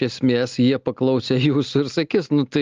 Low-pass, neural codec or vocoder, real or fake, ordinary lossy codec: 7.2 kHz; none; real; Opus, 32 kbps